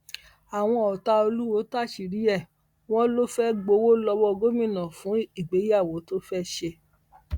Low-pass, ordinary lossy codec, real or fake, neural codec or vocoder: 19.8 kHz; Opus, 64 kbps; real; none